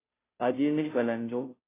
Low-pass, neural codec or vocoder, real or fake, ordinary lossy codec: 3.6 kHz; codec, 16 kHz, 0.5 kbps, FunCodec, trained on Chinese and English, 25 frames a second; fake; AAC, 24 kbps